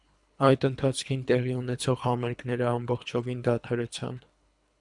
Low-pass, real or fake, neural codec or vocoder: 10.8 kHz; fake; codec, 24 kHz, 3 kbps, HILCodec